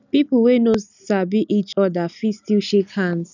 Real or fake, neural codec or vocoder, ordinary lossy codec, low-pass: real; none; none; 7.2 kHz